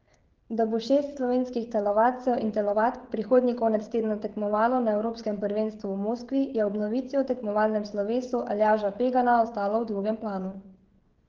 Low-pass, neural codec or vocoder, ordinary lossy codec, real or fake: 7.2 kHz; codec, 16 kHz, 16 kbps, FreqCodec, smaller model; Opus, 16 kbps; fake